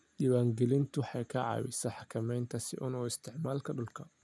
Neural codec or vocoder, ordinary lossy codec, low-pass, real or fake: none; none; none; real